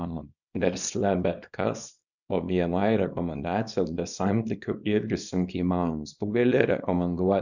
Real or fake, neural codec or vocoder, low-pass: fake; codec, 24 kHz, 0.9 kbps, WavTokenizer, small release; 7.2 kHz